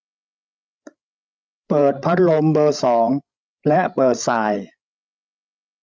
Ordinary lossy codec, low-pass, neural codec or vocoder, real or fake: none; none; codec, 16 kHz, 16 kbps, FreqCodec, larger model; fake